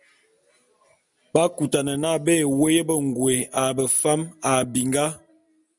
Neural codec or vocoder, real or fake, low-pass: none; real; 10.8 kHz